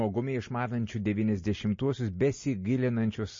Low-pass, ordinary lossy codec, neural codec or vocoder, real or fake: 7.2 kHz; MP3, 32 kbps; none; real